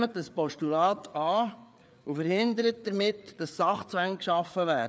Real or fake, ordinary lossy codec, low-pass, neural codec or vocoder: fake; none; none; codec, 16 kHz, 4 kbps, FreqCodec, larger model